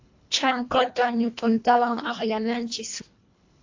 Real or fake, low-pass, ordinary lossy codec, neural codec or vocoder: fake; 7.2 kHz; AAC, 48 kbps; codec, 24 kHz, 1.5 kbps, HILCodec